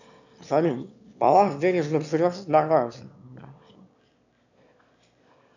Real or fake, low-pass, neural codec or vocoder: fake; 7.2 kHz; autoencoder, 22.05 kHz, a latent of 192 numbers a frame, VITS, trained on one speaker